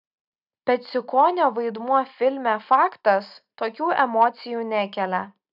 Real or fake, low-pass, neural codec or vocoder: real; 5.4 kHz; none